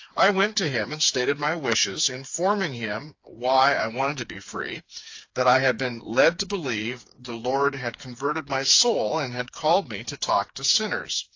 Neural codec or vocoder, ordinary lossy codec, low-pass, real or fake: codec, 16 kHz, 4 kbps, FreqCodec, smaller model; AAC, 48 kbps; 7.2 kHz; fake